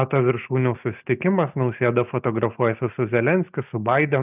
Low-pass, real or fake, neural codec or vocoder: 3.6 kHz; real; none